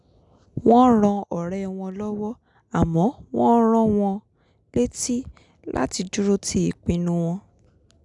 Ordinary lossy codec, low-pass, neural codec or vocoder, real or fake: none; 10.8 kHz; none; real